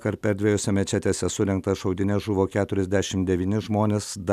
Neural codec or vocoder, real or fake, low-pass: none; real; 14.4 kHz